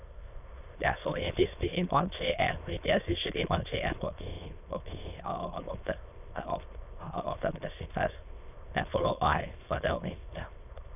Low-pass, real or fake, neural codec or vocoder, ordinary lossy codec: 3.6 kHz; fake; autoencoder, 22.05 kHz, a latent of 192 numbers a frame, VITS, trained on many speakers; none